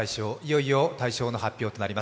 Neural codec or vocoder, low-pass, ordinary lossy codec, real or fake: none; none; none; real